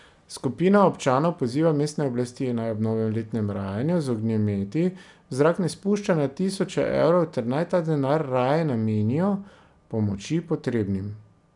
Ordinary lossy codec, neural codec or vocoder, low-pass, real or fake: none; none; 10.8 kHz; real